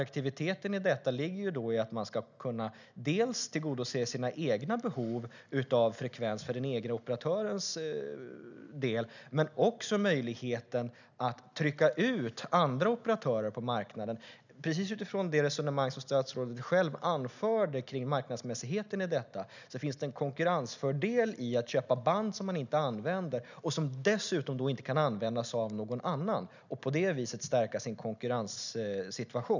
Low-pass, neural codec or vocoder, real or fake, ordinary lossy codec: 7.2 kHz; none; real; none